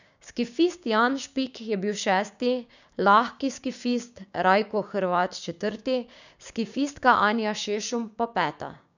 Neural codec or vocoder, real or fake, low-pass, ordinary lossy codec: codec, 16 kHz, 6 kbps, DAC; fake; 7.2 kHz; none